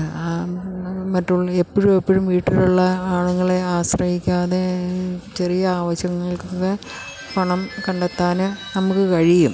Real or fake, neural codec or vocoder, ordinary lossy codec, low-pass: real; none; none; none